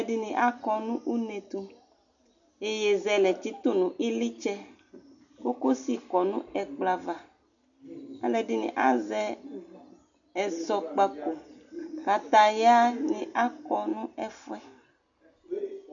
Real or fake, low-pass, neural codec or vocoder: real; 7.2 kHz; none